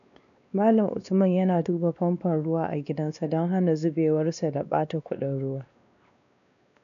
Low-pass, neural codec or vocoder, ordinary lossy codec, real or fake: 7.2 kHz; codec, 16 kHz, 2 kbps, X-Codec, WavLM features, trained on Multilingual LibriSpeech; none; fake